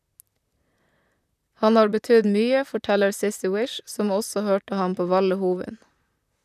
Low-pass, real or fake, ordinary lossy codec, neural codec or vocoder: 14.4 kHz; fake; none; vocoder, 44.1 kHz, 128 mel bands, Pupu-Vocoder